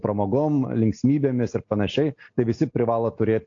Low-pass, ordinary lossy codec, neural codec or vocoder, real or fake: 7.2 kHz; AAC, 48 kbps; none; real